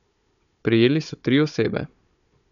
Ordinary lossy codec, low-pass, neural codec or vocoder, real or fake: none; 7.2 kHz; codec, 16 kHz, 16 kbps, FunCodec, trained on Chinese and English, 50 frames a second; fake